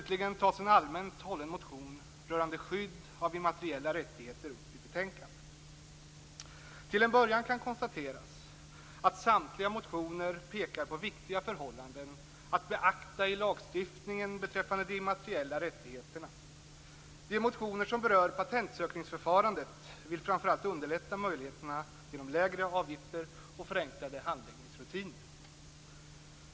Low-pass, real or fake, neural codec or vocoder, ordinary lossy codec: none; real; none; none